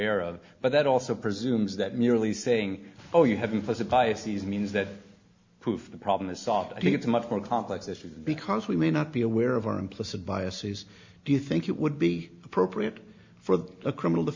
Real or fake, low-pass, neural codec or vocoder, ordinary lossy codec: real; 7.2 kHz; none; MP3, 64 kbps